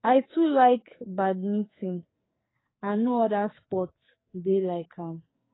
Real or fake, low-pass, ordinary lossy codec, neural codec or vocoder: fake; 7.2 kHz; AAC, 16 kbps; codec, 44.1 kHz, 2.6 kbps, SNAC